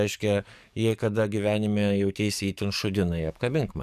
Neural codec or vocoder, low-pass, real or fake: codec, 44.1 kHz, 7.8 kbps, DAC; 14.4 kHz; fake